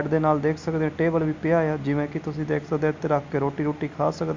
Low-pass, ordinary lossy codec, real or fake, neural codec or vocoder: 7.2 kHz; MP3, 64 kbps; real; none